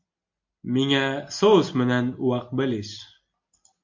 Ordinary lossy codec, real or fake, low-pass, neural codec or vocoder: MP3, 48 kbps; real; 7.2 kHz; none